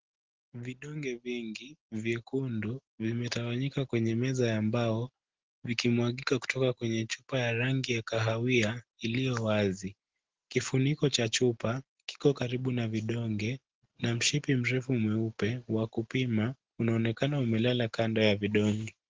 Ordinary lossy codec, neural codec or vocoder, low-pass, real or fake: Opus, 16 kbps; none; 7.2 kHz; real